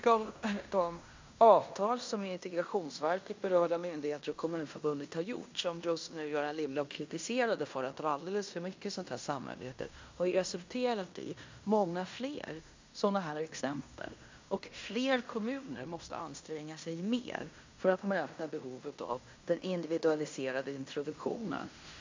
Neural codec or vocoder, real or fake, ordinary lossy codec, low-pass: codec, 16 kHz in and 24 kHz out, 0.9 kbps, LongCat-Audio-Codec, fine tuned four codebook decoder; fake; MP3, 64 kbps; 7.2 kHz